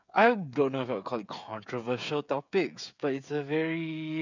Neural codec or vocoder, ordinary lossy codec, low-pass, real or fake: codec, 16 kHz, 8 kbps, FreqCodec, smaller model; none; 7.2 kHz; fake